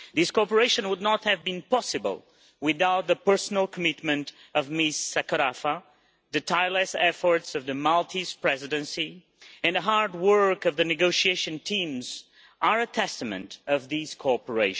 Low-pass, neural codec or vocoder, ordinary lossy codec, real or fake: none; none; none; real